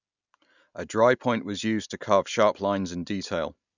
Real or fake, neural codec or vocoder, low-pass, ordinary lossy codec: real; none; 7.2 kHz; none